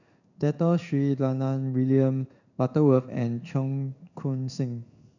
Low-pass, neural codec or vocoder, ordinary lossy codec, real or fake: 7.2 kHz; codec, 16 kHz in and 24 kHz out, 1 kbps, XY-Tokenizer; none; fake